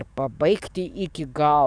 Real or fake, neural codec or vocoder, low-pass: fake; codec, 44.1 kHz, 7.8 kbps, DAC; 9.9 kHz